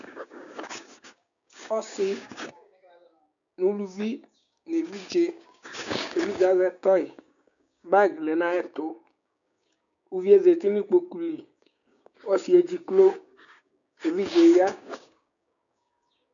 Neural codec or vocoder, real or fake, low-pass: codec, 16 kHz, 6 kbps, DAC; fake; 7.2 kHz